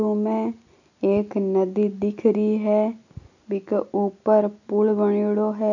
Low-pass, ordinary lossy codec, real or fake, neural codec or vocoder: 7.2 kHz; none; real; none